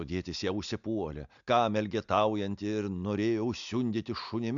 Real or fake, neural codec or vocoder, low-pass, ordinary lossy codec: real; none; 7.2 kHz; MP3, 64 kbps